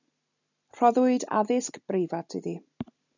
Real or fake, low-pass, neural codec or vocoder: real; 7.2 kHz; none